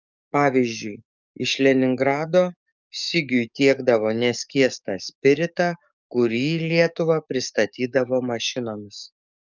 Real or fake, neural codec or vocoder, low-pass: fake; codec, 44.1 kHz, 7.8 kbps, DAC; 7.2 kHz